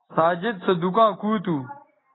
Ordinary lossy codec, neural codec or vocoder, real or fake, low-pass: AAC, 16 kbps; none; real; 7.2 kHz